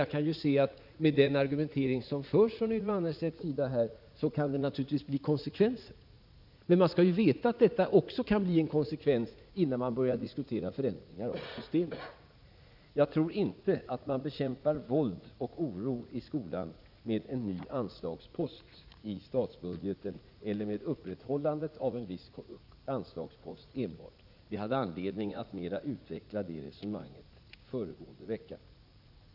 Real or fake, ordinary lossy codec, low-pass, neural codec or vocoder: fake; none; 5.4 kHz; vocoder, 44.1 kHz, 80 mel bands, Vocos